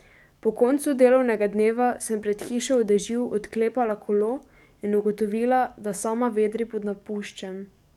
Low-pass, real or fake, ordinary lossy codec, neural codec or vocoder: 19.8 kHz; fake; none; codec, 44.1 kHz, 7.8 kbps, DAC